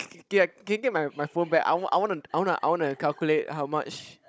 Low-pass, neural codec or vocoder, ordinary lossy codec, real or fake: none; codec, 16 kHz, 16 kbps, FunCodec, trained on Chinese and English, 50 frames a second; none; fake